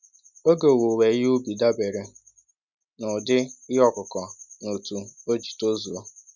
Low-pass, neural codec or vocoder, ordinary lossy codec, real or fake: 7.2 kHz; none; none; real